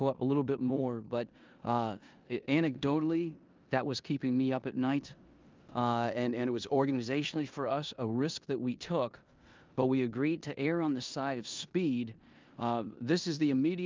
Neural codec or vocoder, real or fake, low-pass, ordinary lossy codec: codec, 16 kHz in and 24 kHz out, 0.9 kbps, LongCat-Audio-Codec, four codebook decoder; fake; 7.2 kHz; Opus, 24 kbps